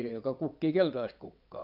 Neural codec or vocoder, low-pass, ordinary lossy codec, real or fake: vocoder, 22.05 kHz, 80 mel bands, WaveNeXt; 5.4 kHz; none; fake